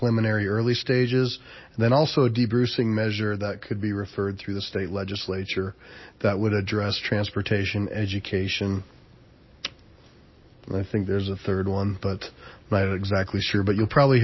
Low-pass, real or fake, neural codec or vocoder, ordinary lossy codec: 7.2 kHz; real; none; MP3, 24 kbps